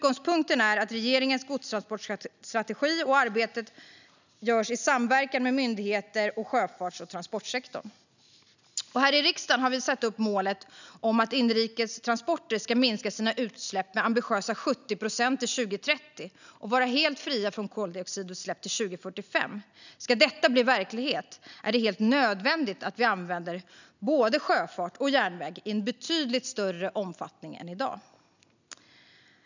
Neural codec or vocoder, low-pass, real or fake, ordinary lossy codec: none; 7.2 kHz; real; none